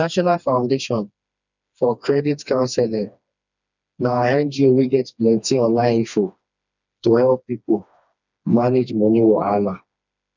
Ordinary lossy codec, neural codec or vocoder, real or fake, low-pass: none; codec, 16 kHz, 2 kbps, FreqCodec, smaller model; fake; 7.2 kHz